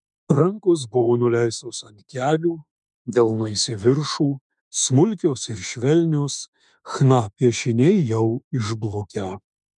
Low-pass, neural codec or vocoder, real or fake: 10.8 kHz; autoencoder, 48 kHz, 32 numbers a frame, DAC-VAE, trained on Japanese speech; fake